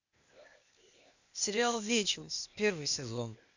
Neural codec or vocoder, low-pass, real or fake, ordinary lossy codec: codec, 16 kHz, 0.8 kbps, ZipCodec; 7.2 kHz; fake; none